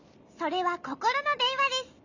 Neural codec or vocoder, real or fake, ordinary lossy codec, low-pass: none; real; Opus, 64 kbps; 7.2 kHz